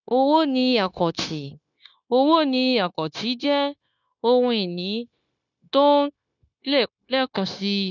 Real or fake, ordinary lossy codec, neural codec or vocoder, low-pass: fake; none; codec, 16 kHz, 0.9 kbps, LongCat-Audio-Codec; 7.2 kHz